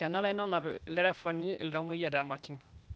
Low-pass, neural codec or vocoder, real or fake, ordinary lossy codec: none; codec, 16 kHz, 0.8 kbps, ZipCodec; fake; none